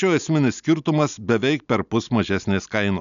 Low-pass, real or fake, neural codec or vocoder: 7.2 kHz; real; none